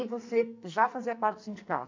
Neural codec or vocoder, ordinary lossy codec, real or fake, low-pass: codec, 44.1 kHz, 2.6 kbps, SNAC; MP3, 64 kbps; fake; 7.2 kHz